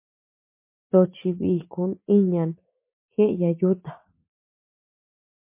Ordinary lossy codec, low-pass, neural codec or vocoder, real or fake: MP3, 24 kbps; 3.6 kHz; vocoder, 44.1 kHz, 80 mel bands, Vocos; fake